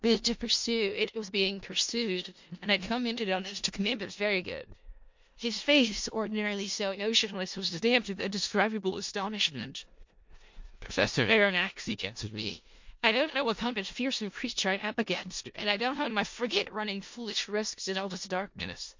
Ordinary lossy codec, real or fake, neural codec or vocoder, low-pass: MP3, 48 kbps; fake; codec, 16 kHz in and 24 kHz out, 0.4 kbps, LongCat-Audio-Codec, four codebook decoder; 7.2 kHz